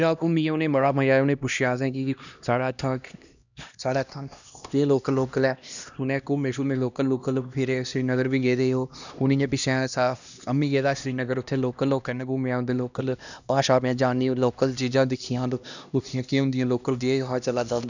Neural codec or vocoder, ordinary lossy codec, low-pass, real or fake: codec, 16 kHz, 1 kbps, X-Codec, HuBERT features, trained on LibriSpeech; none; 7.2 kHz; fake